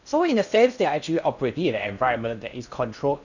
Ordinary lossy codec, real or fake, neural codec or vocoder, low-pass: none; fake; codec, 16 kHz in and 24 kHz out, 0.6 kbps, FocalCodec, streaming, 4096 codes; 7.2 kHz